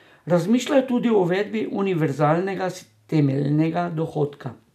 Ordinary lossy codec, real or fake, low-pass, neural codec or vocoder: none; real; 14.4 kHz; none